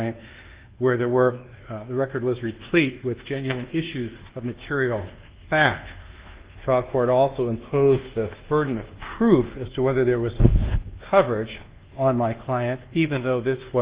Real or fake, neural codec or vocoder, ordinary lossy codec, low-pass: fake; codec, 24 kHz, 1.2 kbps, DualCodec; Opus, 16 kbps; 3.6 kHz